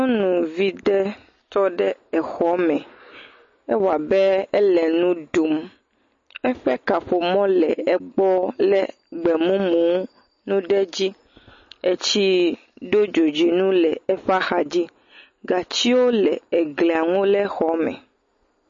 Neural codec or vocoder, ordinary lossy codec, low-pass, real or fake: none; MP3, 32 kbps; 7.2 kHz; real